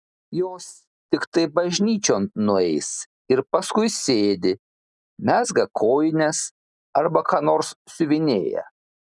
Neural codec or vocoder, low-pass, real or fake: none; 10.8 kHz; real